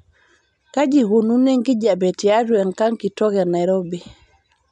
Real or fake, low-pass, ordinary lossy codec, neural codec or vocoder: real; 10.8 kHz; none; none